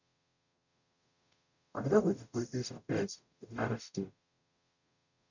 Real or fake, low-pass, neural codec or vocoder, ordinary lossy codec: fake; 7.2 kHz; codec, 44.1 kHz, 0.9 kbps, DAC; none